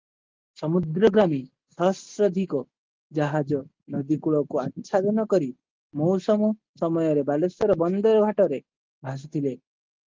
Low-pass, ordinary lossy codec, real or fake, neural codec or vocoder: 7.2 kHz; Opus, 32 kbps; real; none